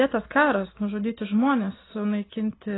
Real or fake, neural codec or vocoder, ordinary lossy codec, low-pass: real; none; AAC, 16 kbps; 7.2 kHz